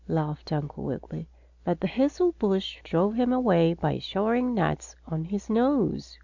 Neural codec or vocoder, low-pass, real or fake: none; 7.2 kHz; real